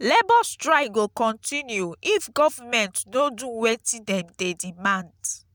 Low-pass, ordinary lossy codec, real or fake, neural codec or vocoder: none; none; real; none